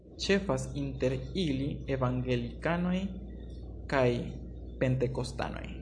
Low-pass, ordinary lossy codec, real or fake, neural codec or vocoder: 9.9 kHz; AAC, 64 kbps; real; none